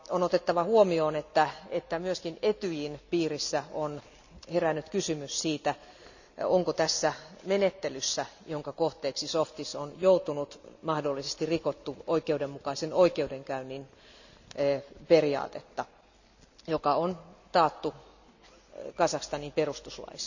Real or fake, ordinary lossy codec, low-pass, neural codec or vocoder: real; none; 7.2 kHz; none